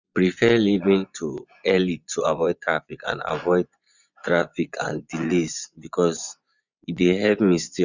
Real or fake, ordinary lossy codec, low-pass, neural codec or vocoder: fake; none; 7.2 kHz; vocoder, 24 kHz, 100 mel bands, Vocos